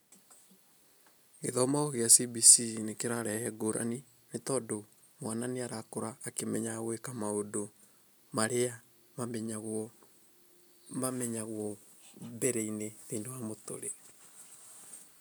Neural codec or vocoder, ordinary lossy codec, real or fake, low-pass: none; none; real; none